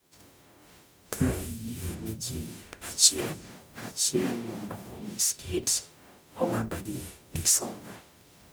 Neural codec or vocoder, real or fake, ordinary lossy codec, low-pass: codec, 44.1 kHz, 0.9 kbps, DAC; fake; none; none